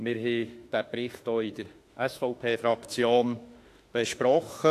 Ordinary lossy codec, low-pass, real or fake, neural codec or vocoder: AAC, 64 kbps; 14.4 kHz; fake; autoencoder, 48 kHz, 32 numbers a frame, DAC-VAE, trained on Japanese speech